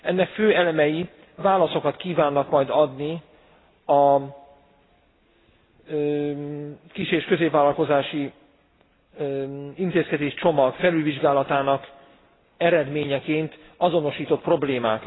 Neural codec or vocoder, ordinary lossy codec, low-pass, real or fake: none; AAC, 16 kbps; 7.2 kHz; real